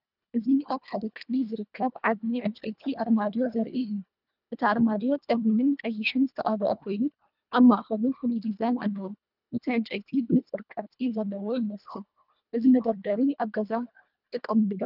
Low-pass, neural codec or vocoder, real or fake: 5.4 kHz; codec, 24 kHz, 1.5 kbps, HILCodec; fake